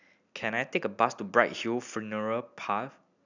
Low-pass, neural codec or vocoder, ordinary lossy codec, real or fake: 7.2 kHz; none; none; real